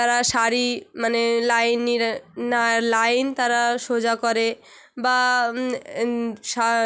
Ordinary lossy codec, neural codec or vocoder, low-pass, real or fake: none; none; none; real